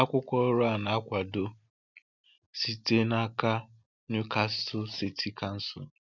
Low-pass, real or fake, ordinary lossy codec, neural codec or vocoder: 7.2 kHz; real; none; none